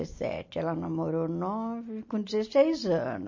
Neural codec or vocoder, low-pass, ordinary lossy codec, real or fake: none; 7.2 kHz; MP3, 32 kbps; real